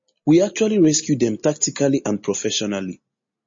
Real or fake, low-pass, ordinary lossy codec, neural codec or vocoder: real; 7.2 kHz; MP3, 32 kbps; none